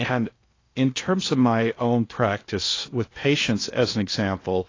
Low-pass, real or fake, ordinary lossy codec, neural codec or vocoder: 7.2 kHz; fake; AAC, 32 kbps; codec, 16 kHz in and 24 kHz out, 0.8 kbps, FocalCodec, streaming, 65536 codes